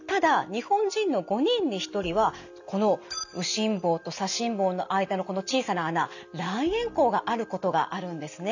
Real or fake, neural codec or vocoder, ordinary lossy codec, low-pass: real; none; none; 7.2 kHz